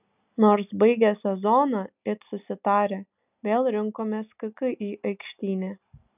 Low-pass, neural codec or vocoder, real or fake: 3.6 kHz; none; real